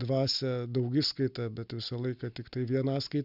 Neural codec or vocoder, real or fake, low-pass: none; real; 5.4 kHz